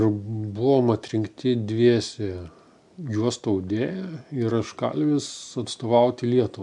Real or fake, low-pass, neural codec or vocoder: real; 10.8 kHz; none